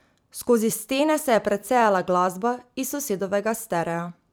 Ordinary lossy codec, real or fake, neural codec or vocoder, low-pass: none; fake; vocoder, 44.1 kHz, 128 mel bands every 256 samples, BigVGAN v2; none